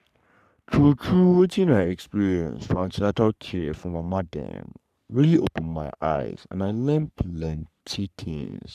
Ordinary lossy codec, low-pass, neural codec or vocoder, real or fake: none; 14.4 kHz; codec, 44.1 kHz, 3.4 kbps, Pupu-Codec; fake